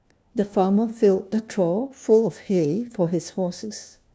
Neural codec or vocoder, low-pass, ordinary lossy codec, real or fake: codec, 16 kHz, 1 kbps, FunCodec, trained on LibriTTS, 50 frames a second; none; none; fake